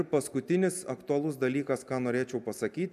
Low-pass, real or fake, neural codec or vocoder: 14.4 kHz; real; none